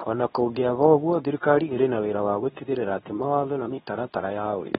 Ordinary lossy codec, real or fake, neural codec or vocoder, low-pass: AAC, 16 kbps; fake; codec, 24 kHz, 0.9 kbps, WavTokenizer, medium speech release version 2; 10.8 kHz